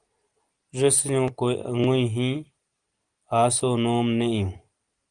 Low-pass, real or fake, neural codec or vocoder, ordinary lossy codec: 9.9 kHz; real; none; Opus, 24 kbps